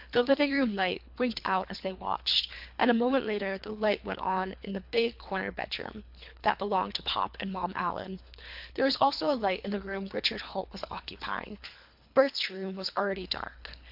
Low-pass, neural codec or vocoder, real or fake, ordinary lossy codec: 5.4 kHz; codec, 24 kHz, 3 kbps, HILCodec; fake; MP3, 48 kbps